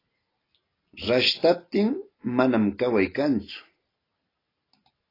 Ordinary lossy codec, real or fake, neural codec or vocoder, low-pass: AAC, 24 kbps; real; none; 5.4 kHz